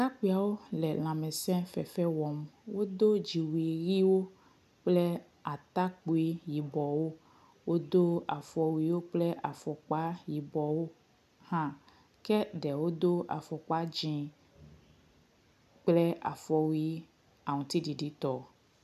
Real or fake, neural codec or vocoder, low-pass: real; none; 14.4 kHz